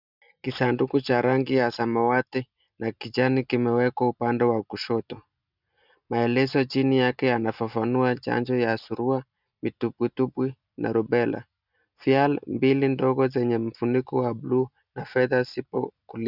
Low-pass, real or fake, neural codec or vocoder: 5.4 kHz; real; none